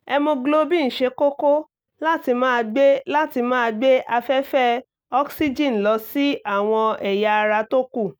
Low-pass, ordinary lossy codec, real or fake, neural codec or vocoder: none; none; real; none